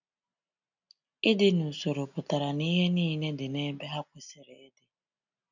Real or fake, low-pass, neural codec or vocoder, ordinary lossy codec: real; 7.2 kHz; none; none